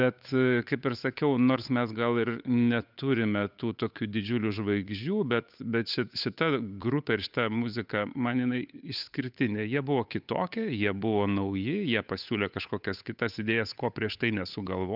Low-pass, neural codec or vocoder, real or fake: 5.4 kHz; codec, 16 kHz, 8 kbps, FunCodec, trained on Chinese and English, 25 frames a second; fake